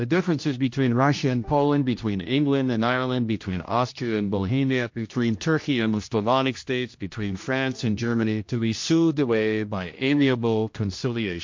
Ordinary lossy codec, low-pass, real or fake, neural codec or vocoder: MP3, 48 kbps; 7.2 kHz; fake; codec, 16 kHz, 1 kbps, X-Codec, HuBERT features, trained on general audio